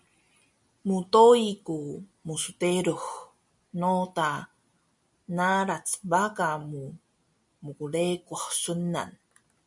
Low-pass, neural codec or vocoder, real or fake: 10.8 kHz; none; real